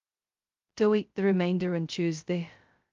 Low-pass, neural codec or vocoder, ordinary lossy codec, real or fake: 7.2 kHz; codec, 16 kHz, 0.2 kbps, FocalCodec; Opus, 32 kbps; fake